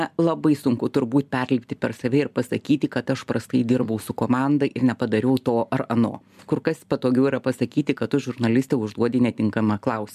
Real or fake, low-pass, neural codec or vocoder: real; 14.4 kHz; none